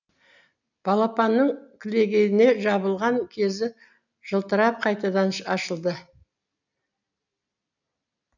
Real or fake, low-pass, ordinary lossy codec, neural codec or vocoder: real; 7.2 kHz; none; none